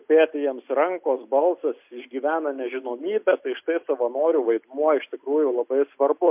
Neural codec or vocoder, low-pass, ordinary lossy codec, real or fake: none; 3.6 kHz; MP3, 32 kbps; real